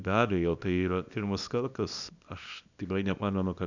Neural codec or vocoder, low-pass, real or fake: codec, 24 kHz, 0.9 kbps, WavTokenizer, small release; 7.2 kHz; fake